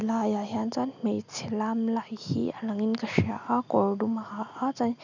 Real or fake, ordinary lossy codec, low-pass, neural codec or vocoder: real; none; 7.2 kHz; none